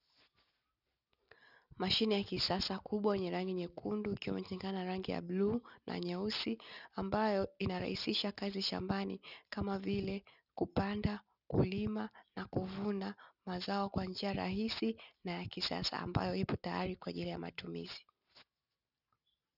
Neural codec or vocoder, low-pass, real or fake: none; 5.4 kHz; real